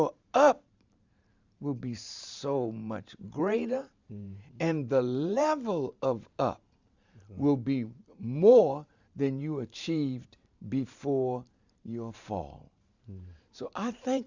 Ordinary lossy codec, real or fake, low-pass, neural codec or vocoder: Opus, 64 kbps; fake; 7.2 kHz; vocoder, 44.1 kHz, 128 mel bands every 512 samples, BigVGAN v2